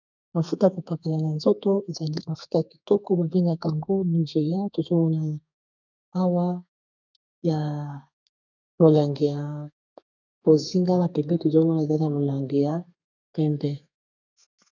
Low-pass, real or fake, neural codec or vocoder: 7.2 kHz; fake; codec, 32 kHz, 1.9 kbps, SNAC